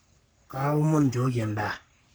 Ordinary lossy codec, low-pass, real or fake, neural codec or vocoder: none; none; fake; codec, 44.1 kHz, 3.4 kbps, Pupu-Codec